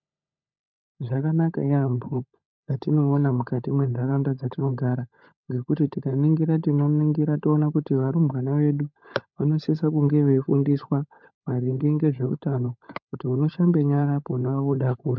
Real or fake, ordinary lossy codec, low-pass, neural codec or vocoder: fake; MP3, 64 kbps; 7.2 kHz; codec, 16 kHz, 16 kbps, FunCodec, trained on LibriTTS, 50 frames a second